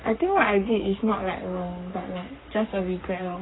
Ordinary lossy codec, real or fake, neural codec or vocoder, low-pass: AAC, 16 kbps; fake; codec, 44.1 kHz, 3.4 kbps, Pupu-Codec; 7.2 kHz